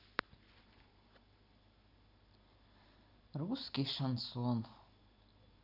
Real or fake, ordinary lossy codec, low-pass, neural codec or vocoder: real; none; 5.4 kHz; none